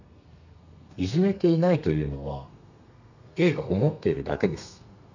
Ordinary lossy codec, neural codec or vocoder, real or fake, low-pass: none; codec, 32 kHz, 1.9 kbps, SNAC; fake; 7.2 kHz